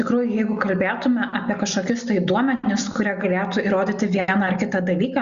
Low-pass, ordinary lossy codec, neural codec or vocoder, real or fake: 7.2 kHz; Opus, 64 kbps; none; real